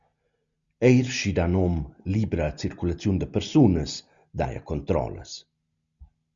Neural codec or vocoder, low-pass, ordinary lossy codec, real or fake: none; 7.2 kHz; Opus, 64 kbps; real